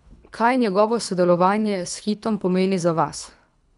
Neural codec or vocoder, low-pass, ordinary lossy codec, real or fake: codec, 24 kHz, 3 kbps, HILCodec; 10.8 kHz; none; fake